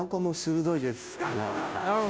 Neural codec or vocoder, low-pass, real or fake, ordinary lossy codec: codec, 16 kHz, 0.5 kbps, FunCodec, trained on Chinese and English, 25 frames a second; none; fake; none